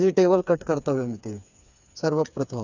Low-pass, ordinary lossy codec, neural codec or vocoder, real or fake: 7.2 kHz; none; codec, 16 kHz, 4 kbps, FreqCodec, smaller model; fake